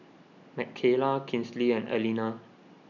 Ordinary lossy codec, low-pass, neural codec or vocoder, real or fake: none; 7.2 kHz; none; real